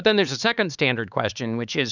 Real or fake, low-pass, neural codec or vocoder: fake; 7.2 kHz; codec, 16 kHz, 4 kbps, X-Codec, HuBERT features, trained on LibriSpeech